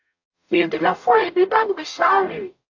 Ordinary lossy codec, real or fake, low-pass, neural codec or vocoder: AAC, 48 kbps; fake; 7.2 kHz; codec, 44.1 kHz, 0.9 kbps, DAC